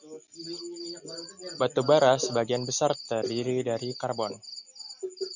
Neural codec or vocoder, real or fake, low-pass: none; real; 7.2 kHz